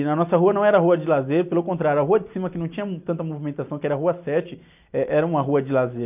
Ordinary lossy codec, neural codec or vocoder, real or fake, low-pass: none; none; real; 3.6 kHz